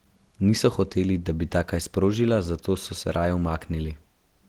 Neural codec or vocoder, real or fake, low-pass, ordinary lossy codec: none; real; 19.8 kHz; Opus, 16 kbps